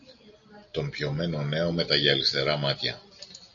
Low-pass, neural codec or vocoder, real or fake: 7.2 kHz; none; real